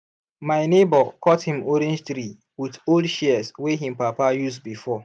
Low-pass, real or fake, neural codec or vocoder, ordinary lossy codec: 9.9 kHz; real; none; none